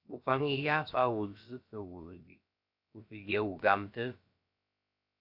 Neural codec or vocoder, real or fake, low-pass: codec, 16 kHz, about 1 kbps, DyCAST, with the encoder's durations; fake; 5.4 kHz